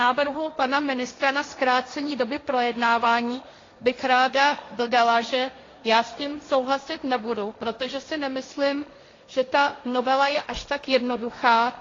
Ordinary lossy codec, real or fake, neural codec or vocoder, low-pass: AAC, 32 kbps; fake; codec, 16 kHz, 1.1 kbps, Voila-Tokenizer; 7.2 kHz